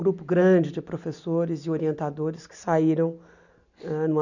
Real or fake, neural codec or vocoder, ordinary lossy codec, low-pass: real; none; none; 7.2 kHz